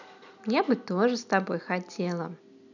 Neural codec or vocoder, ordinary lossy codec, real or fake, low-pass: none; none; real; 7.2 kHz